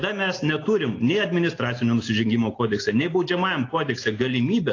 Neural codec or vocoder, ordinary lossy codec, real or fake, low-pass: none; AAC, 32 kbps; real; 7.2 kHz